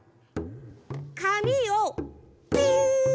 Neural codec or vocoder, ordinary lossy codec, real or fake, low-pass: none; none; real; none